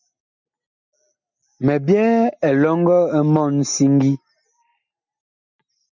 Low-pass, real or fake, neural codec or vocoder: 7.2 kHz; real; none